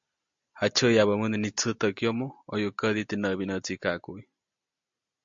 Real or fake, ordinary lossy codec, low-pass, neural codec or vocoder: real; MP3, 48 kbps; 7.2 kHz; none